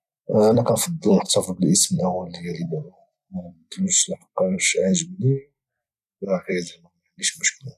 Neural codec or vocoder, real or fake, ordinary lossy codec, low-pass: vocoder, 44.1 kHz, 128 mel bands every 256 samples, BigVGAN v2; fake; none; 14.4 kHz